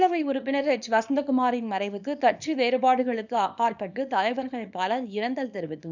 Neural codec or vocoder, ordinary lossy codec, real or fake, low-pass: codec, 24 kHz, 0.9 kbps, WavTokenizer, small release; none; fake; 7.2 kHz